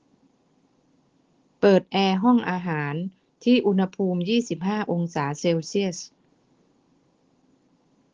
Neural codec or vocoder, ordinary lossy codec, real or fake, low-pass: none; Opus, 16 kbps; real; 7.2 kHz